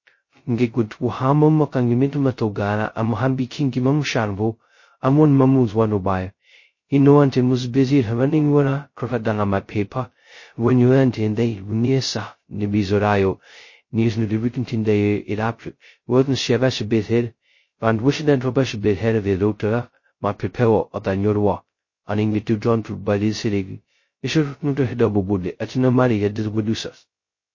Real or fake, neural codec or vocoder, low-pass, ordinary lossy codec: fake; codec, 16 kHz, 0.2 kbps, FocalCodec; 7.2 kHz; MP3, 32 kbps